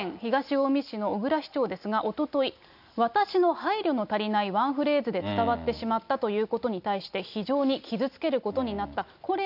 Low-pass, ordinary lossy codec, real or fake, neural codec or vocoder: 5.4 kHz; none; real; none